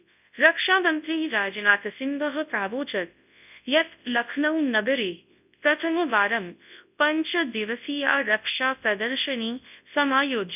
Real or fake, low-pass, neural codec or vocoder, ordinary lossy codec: fake; 3.6 kHz; codec, 24 kHz, 0.9 kbps, WavTokenizer, large speech release; none